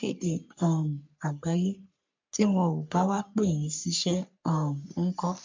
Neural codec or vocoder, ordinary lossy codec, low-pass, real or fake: codec, 44.1 kHz, 3.4 kbps, Pupu-Codec; MP3, 64 kbps; 7.2 kHz; fake